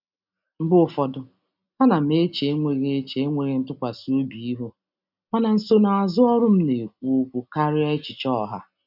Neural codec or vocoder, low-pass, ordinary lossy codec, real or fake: none; 5.4 kHz; none; real